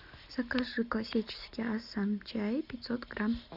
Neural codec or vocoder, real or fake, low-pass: none; real; 5.4 kHz